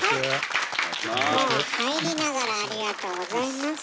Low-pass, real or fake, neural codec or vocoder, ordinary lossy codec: none; real; none; none